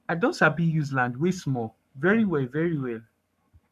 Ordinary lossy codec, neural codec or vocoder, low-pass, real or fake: none; codec, 44.1 kHz, 7.8 kbps, Pupu-Codec; 14.4 kHz; fake